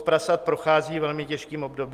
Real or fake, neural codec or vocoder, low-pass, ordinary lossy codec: real; none; 14.4 kHz; Opus, 24 kbps